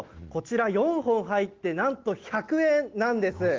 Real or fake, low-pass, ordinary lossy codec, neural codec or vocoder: real; 7.2 kHz; Opus, 16 kbps; none